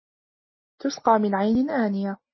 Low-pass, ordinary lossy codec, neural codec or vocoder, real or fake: 7.2 kHz; MP3, 24 kbps; none; real